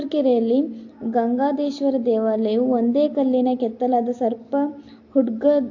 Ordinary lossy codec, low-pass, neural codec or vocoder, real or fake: AAC, 48 kbps; 7.2 kHz; none; real